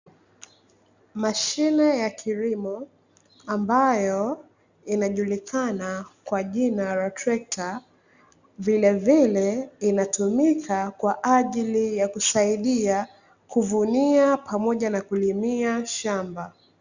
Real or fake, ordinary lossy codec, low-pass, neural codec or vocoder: real; Opus, 64 kbps; 7.2 kHz; none